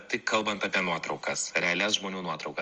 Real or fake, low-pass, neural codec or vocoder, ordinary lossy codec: real; 7.2 kHz; none; Opus, 24 kbps